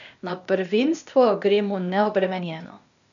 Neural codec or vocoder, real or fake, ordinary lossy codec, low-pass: codec, 16 kHz, 0.8 kbps, ZipCodec; fake; none; 7.2 kHz